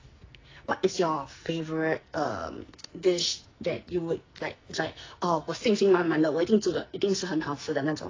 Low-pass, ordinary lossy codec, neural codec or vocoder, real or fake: 7.2 kHz; AAC, 32 kbps; codec, 44.1 kHz, 2.6 kbps, SNAC; fake